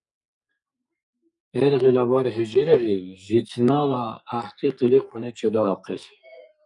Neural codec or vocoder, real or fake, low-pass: codec, 44.1 kHz, 2.6 kbps, SNAC; fake; 10.8 kHz